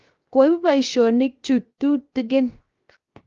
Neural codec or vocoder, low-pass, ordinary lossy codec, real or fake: codec, 16 kHz, 0.7 kbps, FocalCodec; 7.2 kHz; Opus, 32 kbps; fake